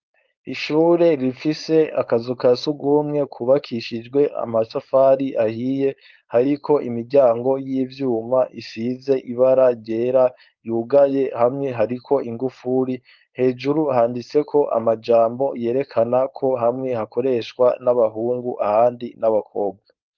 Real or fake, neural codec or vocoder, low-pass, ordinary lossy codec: fake; codec, 16 kHz, 4.8 kbps, FACodec; 7.2 kHz; Opus, 32 kbps